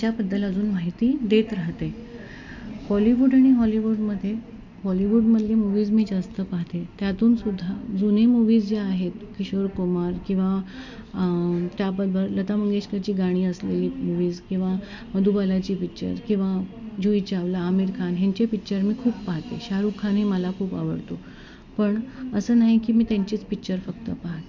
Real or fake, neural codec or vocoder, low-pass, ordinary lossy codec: real; none; 7.2 kHz; none